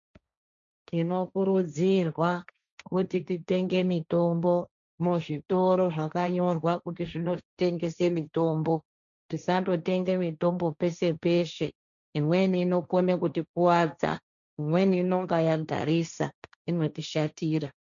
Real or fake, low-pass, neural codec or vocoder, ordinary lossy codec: fake; 7.2 kHz; codec, 16 kHz, 1.1 kbps, Voila-Tokenizer; MP3, 96 kbps